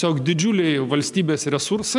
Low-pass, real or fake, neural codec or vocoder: 10.8 kHz; real; none